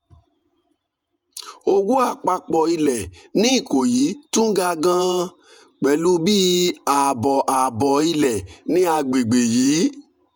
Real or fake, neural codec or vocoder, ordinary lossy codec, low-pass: fake; vocoder, 48 kHz, 128 mel bands, Vocos; none; none